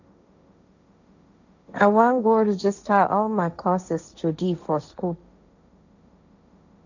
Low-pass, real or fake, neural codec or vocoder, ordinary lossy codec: 7.2 kHz; fake; codec, 16 kHz, 1.1 kbps, Voila-Tokenizer; none